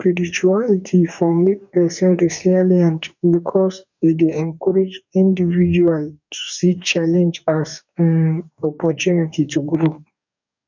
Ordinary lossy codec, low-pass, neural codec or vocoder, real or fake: none; 7.2 kHz; codec, 44.1 kHz, 2.6 kbps, DAC; fake